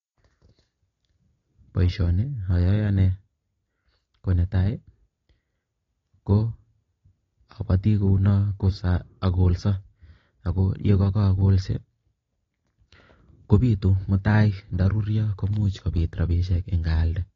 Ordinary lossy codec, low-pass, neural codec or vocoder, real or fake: AAC, 32 kbps; 7.2 kHz; none; real